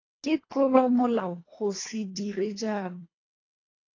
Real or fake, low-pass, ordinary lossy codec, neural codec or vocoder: fake; 7.2 kHz; AAC, 32 kbps; codec, 24 kHz, 1.5 kbps, HILCodec